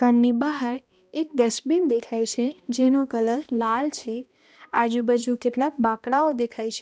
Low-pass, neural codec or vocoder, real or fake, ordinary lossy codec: none; codec, 16 kHz, 1 kbps, X-Codec, HuBERT features, trained on balanced general audio; fake; none